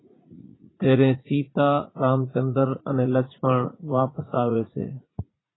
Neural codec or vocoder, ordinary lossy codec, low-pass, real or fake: vocoder, 44.1 kHz, 80 mel bands, Vocos; AAC, 16 kbps; 7.2 kHz; fake